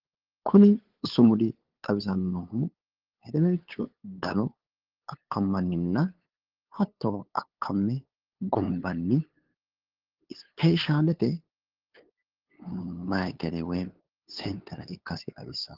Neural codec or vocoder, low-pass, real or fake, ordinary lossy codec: codec, 16 kHz, 8 kbps, FunCodec, trained on LibriTTS, 25 frames a second; 5.4 kHz; fake; Opus, 16 kbps